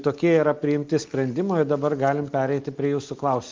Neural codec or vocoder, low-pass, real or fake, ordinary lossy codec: none; 7.2 kHz; real; Opus, 24 kbps